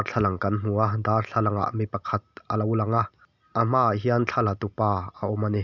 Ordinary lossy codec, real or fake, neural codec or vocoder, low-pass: none; real; none; 7.2 kHz